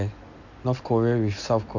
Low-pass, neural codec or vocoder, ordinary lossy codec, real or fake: 7.2 kHz; none; none; real